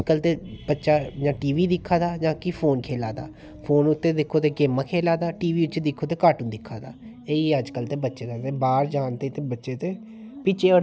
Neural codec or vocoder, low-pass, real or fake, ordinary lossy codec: none; none; real; none